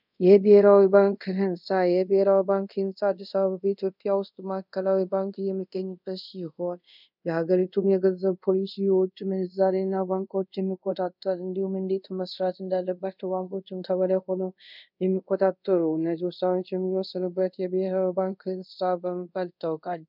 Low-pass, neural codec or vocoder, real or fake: 5.4 kHz; codec, 24 kHz, 0.5 kbps, DualCodec; fake